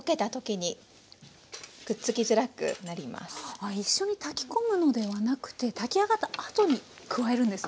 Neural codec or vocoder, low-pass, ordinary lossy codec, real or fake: none; none; none; real